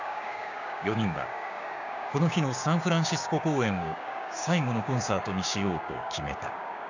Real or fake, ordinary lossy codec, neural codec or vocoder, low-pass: fake; none; codec, 16 kHz, 6 kbps, DAC; 7.2 kHz